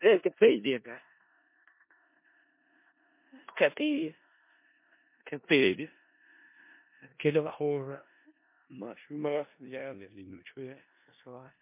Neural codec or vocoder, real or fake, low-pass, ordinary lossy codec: codec, 16 kHz in and 24 kHz out, 0.4 kbps, LongCat-Audio-Codec, four codebook decoder; fake; 3.6 kHz; MP3, 24 kbps